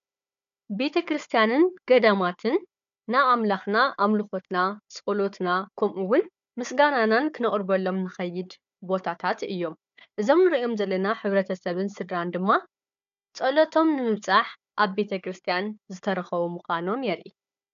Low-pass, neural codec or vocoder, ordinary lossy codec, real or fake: 7.2 kHz; codec, 16 kHz, 4 kbps, FunCodec, trained on Chinese and English, 50 frames a second; AAC, 96 kbps; fake